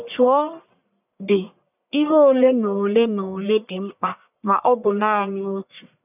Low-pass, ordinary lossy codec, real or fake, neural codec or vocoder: 3.6 kHz; none; fake; codec, 44.1 kHz, 1.7 kbps, Pupu-Codec